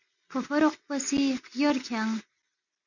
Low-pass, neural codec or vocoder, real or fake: 7.2 kHz; none; real